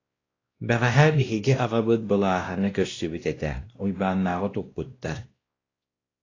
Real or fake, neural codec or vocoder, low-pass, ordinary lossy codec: fake; codec, 16 kHz, 1 kbps, X-Codec, WavLM features, trained on Multilingual LibriSpeech; 7.2 kHz; AAC, 32 kbps